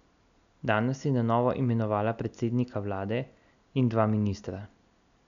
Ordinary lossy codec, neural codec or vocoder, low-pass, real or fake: none; none; 7.2 kHz; real